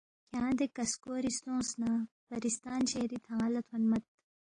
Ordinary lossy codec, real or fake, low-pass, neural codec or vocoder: AAC, 32 kbps; real; 9.9 kHz; none